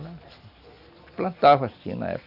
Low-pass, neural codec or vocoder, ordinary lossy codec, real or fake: 5.4 kHz; none; none; real